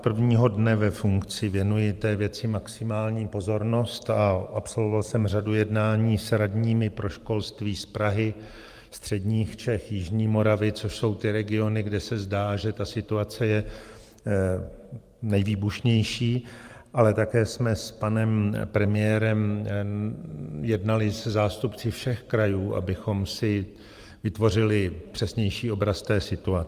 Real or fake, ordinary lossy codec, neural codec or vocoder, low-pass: fake; Opus, 32 kbps; vocoder, 44.1 kHz, 128 mel bands every 512 samples, BigVGAN v2; 14.4 kHz